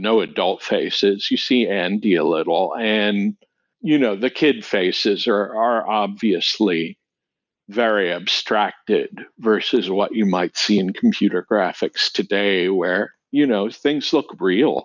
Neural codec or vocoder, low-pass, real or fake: none; 7.2 kHz; real